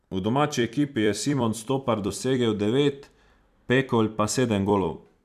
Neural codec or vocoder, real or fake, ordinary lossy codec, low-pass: vocoder, 44.1 kHz, 128 mel bands every 256 samples, BigVGAN v2; fake; none; 14.4 kHz